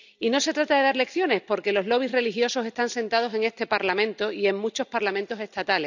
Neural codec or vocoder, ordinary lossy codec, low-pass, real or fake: none; none; 7.2 kHz; real